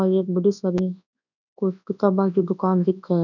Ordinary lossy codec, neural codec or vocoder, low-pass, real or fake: none; codec, 24 kHz, 0.9 kbps, WavTokenizer, large speech release; 7.2 kHz; fake